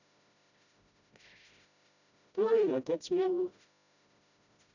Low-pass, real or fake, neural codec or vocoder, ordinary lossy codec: 7.2 kHz; fake; codec, 16 kHz, 0.5 kbps, FreqCodec, smaller model; none